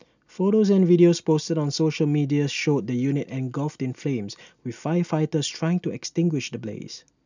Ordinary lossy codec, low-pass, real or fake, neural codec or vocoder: none; 7.2 kHz; real; none